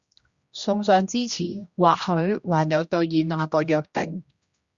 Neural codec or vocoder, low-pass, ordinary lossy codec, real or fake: codec, 16 kHz, 1 kbps, X-Codec, HuBERT features, trained on general audio; 7.2 kHz; Opus, 64 kbps; fake